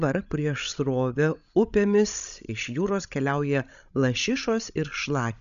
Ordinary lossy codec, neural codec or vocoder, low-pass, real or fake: MP3, 96 kbps; codec, 16 kHz, 16 kbps, FreqCodec, larger model; 7.2 kHz; fake